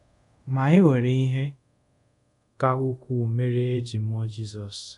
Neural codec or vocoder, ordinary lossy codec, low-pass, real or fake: codec, 24 kHz, 0.5 kbps, DualCodec; none; 10.8 kHz; fake